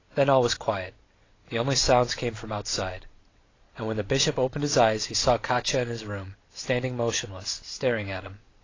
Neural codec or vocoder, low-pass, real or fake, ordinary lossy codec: none; 7.2 kHz; real; AAC, 32 kbps